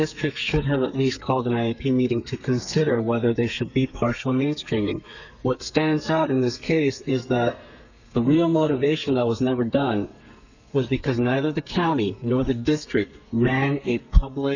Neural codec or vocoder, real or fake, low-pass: codec, 32 kHz, 1.9 kbps, SNAC; fake; 7.2 kHz